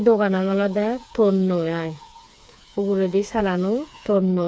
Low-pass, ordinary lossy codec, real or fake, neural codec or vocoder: none; none; fake; codec, 16 kHz, 4 kbps, FreqCodec, smaller model